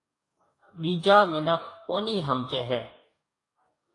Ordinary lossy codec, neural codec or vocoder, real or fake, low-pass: AAC, 48 kbps; codec, 44.1 kHz, 2.6 kbps, DAC; fake; 10.8 kHz